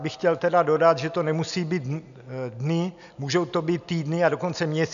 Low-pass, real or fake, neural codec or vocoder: 7.2 kHz; real; none